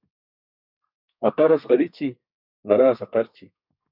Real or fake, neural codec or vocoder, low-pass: fake; codec, 32 kHz, 1.9 kbps, SNAC; 5.4 kHz